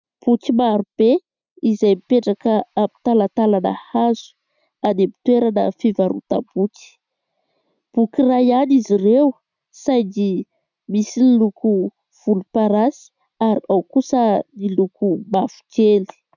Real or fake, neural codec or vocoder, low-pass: real; none; 7.2 kHz